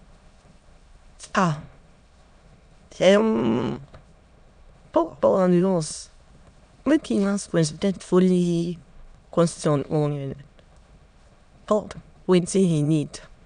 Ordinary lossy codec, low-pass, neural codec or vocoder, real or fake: none; 9.9 kHz; autoencoder, 22.05 kHz, a latent of 192 numbers a frame, VITS, trained on many speakers; fake